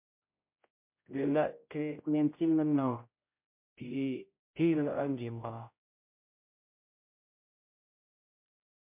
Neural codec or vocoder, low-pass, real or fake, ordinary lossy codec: codec, 16 kHz, 0.5 kbps, X-Codec, HuBERT features, trained on general audio; 3.6 kHz; fake; MP3, 32 kbps